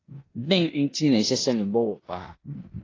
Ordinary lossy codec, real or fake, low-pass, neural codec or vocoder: AAC, 32 kbps; fake; 7.2 kHz; codec, 16 kHz in and 24 kHz out, 0.9 kbps, LongCat-Audio-Codec, four codebook decoder